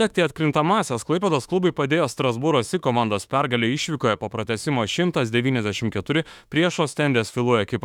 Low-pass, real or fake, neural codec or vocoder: 19.8 kHz; fake; autoencoder, 48 kHz, 32 numbers a frame, DAC-VAE, trained on Japanese speech